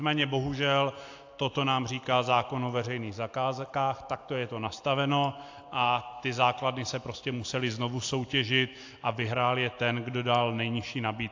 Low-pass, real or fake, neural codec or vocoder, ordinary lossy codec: 7.2 kHz; real; none; AAC, 48 kbps